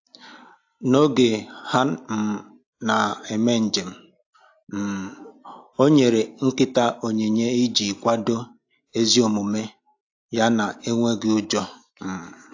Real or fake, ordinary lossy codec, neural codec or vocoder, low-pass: real; AAC, 48 kbps; none; 7.2 kHz